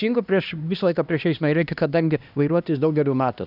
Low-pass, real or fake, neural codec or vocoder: 5.4 kHz; fake; codec, 16 kHz, 1 kbps, X-Codec, HuBERT features, trained on LibriSpeech